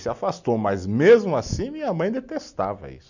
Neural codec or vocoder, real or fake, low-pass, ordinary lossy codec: none; real; 7.2 kHz; none